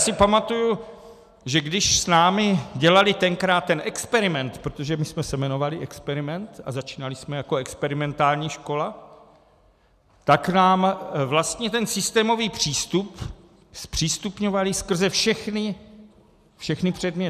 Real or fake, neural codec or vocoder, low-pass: real; none; 14.4 kHz